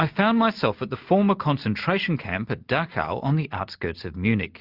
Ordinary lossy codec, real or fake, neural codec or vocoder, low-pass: Opus, 24 kbps; real; none; 5.4 kHz